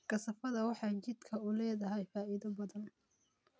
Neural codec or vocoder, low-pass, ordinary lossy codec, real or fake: none; none; none; real